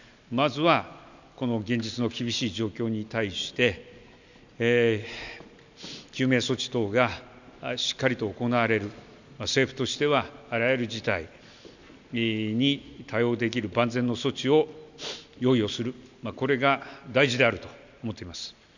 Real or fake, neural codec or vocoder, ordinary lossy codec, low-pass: real; none; none; 7.2 kHz